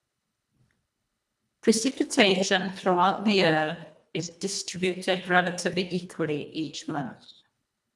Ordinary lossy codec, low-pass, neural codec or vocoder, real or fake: none; none; codec, 24 kHz, 1.5 kbps, HILCodec; fake